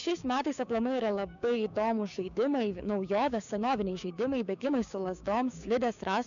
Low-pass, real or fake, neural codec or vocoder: 7.2 kHz; fake; codec, 16 kHz, 6 kbps, DAC